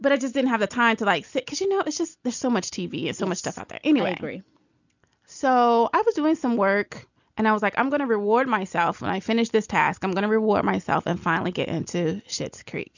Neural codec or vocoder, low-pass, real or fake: none; 7.2 kHz; real